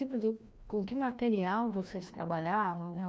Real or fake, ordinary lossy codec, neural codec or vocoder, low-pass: fake; none; codec, 16 kHz, 1 kbps, FreqCodec, larger model; none